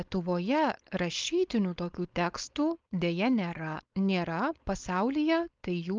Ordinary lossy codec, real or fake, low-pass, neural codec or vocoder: Opus, 24 kbps; fake; 7.2 kHz; codec, 16 kHz, 4.8 kbps, FACodec